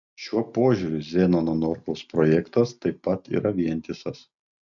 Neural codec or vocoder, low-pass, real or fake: none; 7.2 kHz; real